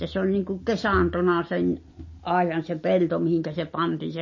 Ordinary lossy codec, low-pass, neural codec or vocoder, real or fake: MP3, 32 kbps; 7.2 kHz; none; real